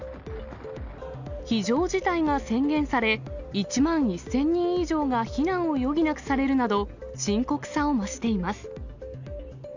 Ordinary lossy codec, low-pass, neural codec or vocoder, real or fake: none; 7.2 kHz; none; real